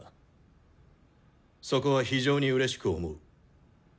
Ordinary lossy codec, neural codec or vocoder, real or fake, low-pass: none; none; real; none